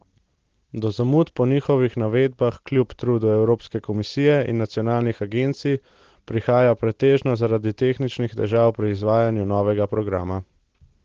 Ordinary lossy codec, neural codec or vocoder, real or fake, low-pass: Opus, 16 kbps; none; real; 7.2 kHz